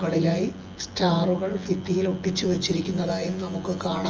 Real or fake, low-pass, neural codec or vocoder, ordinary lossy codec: fake; 7.2 kHz; vocoder, 24 kHz, 100 mel bands, Vocos; Opus, 24 kbps